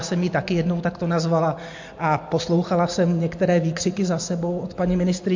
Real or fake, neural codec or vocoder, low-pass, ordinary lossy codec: real; none; 7.2 kHz; MP3, 48 kbps